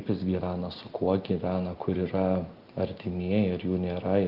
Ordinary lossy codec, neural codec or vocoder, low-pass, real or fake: Opus, 32 kbps; none; 5.4 kHz; real